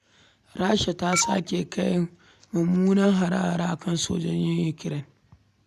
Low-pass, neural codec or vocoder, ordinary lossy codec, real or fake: 14.4 kHz; none; none; real